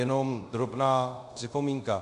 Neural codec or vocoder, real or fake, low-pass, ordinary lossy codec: codec, 24 kHz, 0.5 kbps, DualCodec; fake; 10.8 kHz; MP3, 96 kbps